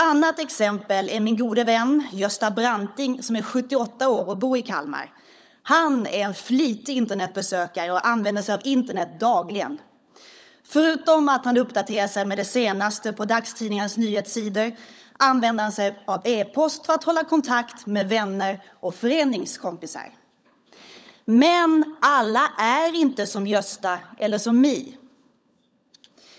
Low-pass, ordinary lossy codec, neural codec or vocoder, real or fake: none; none; codec, 16 kHz, 16 kbps, FunCodec, trained on LibriTTS, 50 frames a second; fake